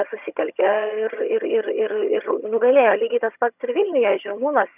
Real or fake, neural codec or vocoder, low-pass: fake; vocoder, 22.05 kHz, 80 mel bands, HiFi-GAN; 3.6 kHz